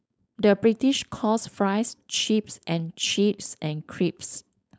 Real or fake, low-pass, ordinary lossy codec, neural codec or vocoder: fake; none; none; codec, 16 kHz, 4.8 kbps, FACodec